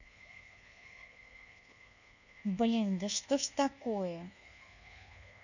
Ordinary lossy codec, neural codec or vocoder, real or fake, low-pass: none; codec, 24 kHz, 1.2 kbps, DualCodec; fake; 7.2 kHz